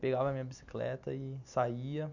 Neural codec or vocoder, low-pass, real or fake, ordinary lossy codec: none; 7.2 kHz; real; none